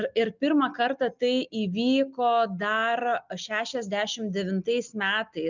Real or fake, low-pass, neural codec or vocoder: real; 7.2 kHz; none